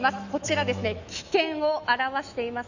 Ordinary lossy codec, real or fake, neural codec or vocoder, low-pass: none; fake; autoencoder, 48 kHz, 128 numbers a frame, DAC-VAE, trained on Japanese speech; 7.2 kHz